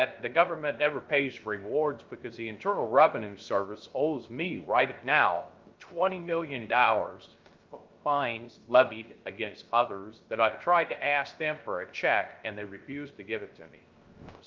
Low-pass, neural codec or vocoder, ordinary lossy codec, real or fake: 7.2 kHz; codec, 16 kHz, 0.3 kbps, FocalCodec; Opus, 32 kbps; fake